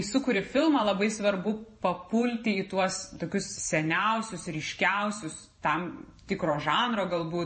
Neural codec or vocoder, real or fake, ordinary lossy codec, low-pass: vocoder, 44.1 kHz, 128 mel bands every 512 samples, BigVGAN v2; fake; MP3, 32 kbps; 10.8 kHz